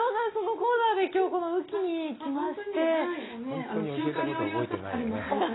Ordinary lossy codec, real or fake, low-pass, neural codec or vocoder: AAC, 16 kbps; real; 7.2 kHz; none